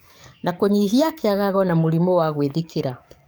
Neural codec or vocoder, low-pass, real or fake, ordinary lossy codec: codec, 44.1 kHz, 7.8 kbps, DAC; none; fake; none